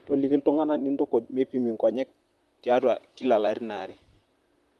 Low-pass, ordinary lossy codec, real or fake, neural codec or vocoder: 14.4 kHz; Opus, 32 kbps; fake; vocoder, 44.1 kHz, 128 mel bands, Pupu-Vocoder